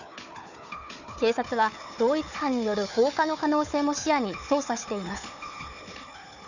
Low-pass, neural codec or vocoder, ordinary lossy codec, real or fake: 7.2 kHz; codec, 16 kHz, 4 kbps, FunCodec, trained on Chinese and English, 50 frames a second; none; fake